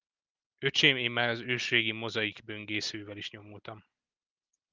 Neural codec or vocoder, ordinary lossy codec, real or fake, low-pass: none; Opus, 32 kbps; real; 7.2 kHz